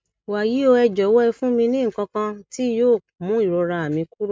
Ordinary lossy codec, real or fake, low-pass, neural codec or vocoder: none; real; none; none